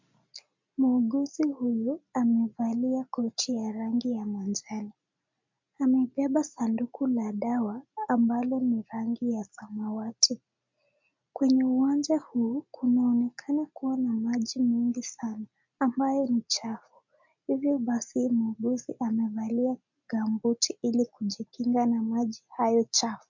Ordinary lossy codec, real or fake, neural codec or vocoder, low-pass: MP3, 64 kbps; real; none; 7.2 kHz